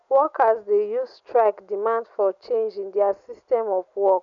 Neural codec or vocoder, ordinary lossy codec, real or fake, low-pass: none; none; real; 7.2 kHz